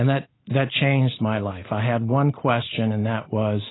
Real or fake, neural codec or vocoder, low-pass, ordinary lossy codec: real; none; 7.2 kHz; AAC, 16 kbps